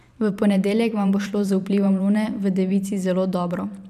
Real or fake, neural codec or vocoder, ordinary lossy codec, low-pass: fake; vocoder, 44.1 kHz, 128 mel bands every 512 samples, BigVGAN v2; none; 14.4 kHz